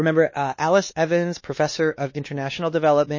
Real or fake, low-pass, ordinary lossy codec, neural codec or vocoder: fake; 7.2 kHz; MP3, 32 kbps; codec, 16 kHz, 2 kbps, X-Codec, WavLM features, trained on Multilingual LibriSpeech